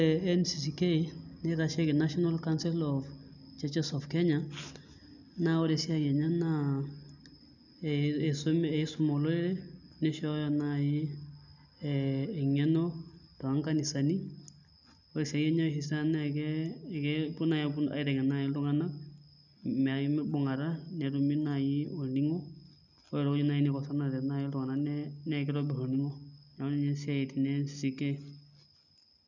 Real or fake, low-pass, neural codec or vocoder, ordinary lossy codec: real; 7.2 kHz; none; none